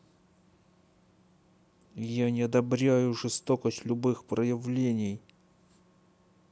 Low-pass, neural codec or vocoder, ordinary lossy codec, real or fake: none; none; none; real